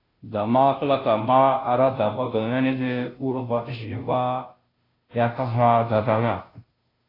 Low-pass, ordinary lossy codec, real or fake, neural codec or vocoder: 5.4 kHz; AAC, 24 kbps; fake; codec, 16 kHz, 0.5 kbps, FunCodec, trained on Chinese and English, 25 frames a second